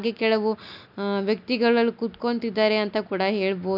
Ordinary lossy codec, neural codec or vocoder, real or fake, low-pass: none; none; real; 5.4 kHz